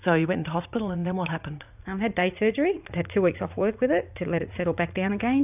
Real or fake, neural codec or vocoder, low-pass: fake; vocoder, 44.1 kHz, 80 mel bands, Vocos; 3.6 kHz